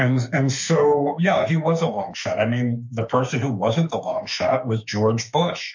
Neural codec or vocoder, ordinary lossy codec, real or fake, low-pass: autoencoder, 48 kHz, 32 numbers a frame, DAC-VAE, trained on Japanese speech; MP3, 48 kbps; fake; 7.2 kHz